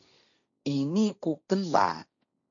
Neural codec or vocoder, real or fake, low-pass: codec, 16 kHz, 1.1 kbps, Voila-Tokenizer; fake; 7.2 kHz